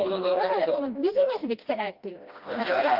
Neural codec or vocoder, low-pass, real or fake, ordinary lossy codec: codec, 16 kHz, 1 kbps, FreqCodec, smaller model; 5.4 kHz; fake; Opus, 24 kbps